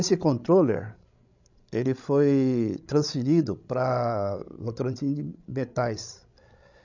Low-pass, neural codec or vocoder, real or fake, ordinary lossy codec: 7.2 kHz; codec, 16 kHz, 16 kbps, FreqCodec, larger model; fake; none